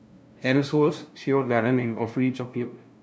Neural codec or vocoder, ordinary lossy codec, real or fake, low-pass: codec, 16 kHz, 0.5 kbps, FunCodec, trained on LibriTTS, 25 frames a second; none; fake; none